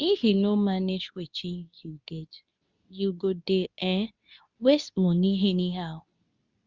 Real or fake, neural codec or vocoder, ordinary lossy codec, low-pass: fake; codec, 24 kHz, 0.9 kbps, WavTokenizer, medium speech release version 2; Opus, 64 kbps; 7.2 kHz